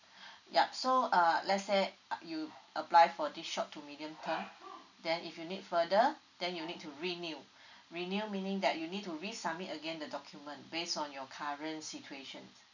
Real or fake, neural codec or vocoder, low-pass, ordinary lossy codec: real; none; 7.2 kHz; none